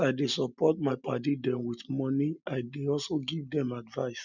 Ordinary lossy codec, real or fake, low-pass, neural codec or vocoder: none; real; 7.2 kHz; none